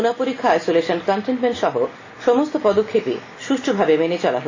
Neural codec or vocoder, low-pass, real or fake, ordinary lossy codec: none; 7.2 kHz; real; AAC, 32 kbps